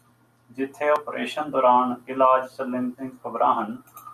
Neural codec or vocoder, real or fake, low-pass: none; real; 14.4 kHz